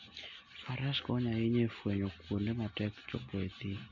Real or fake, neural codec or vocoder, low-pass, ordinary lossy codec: real; none; 7.2 kHz; none